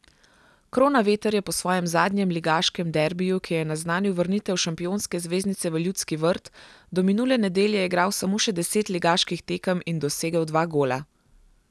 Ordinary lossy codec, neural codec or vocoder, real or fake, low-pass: none; none; real; none